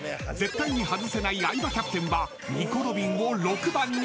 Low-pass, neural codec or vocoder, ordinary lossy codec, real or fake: none; none; none; real